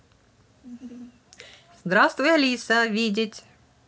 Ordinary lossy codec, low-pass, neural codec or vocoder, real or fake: none; none; none; real